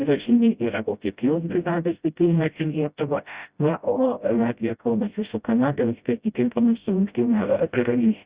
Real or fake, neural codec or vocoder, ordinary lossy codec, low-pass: fake; codec, 16 kHz, 0.5 kbps, FreqCodec, smaller model; Opus, 64 kbps; 3.6 kHz